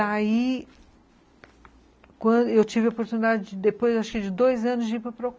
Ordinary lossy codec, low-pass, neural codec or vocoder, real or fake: none; none; none; real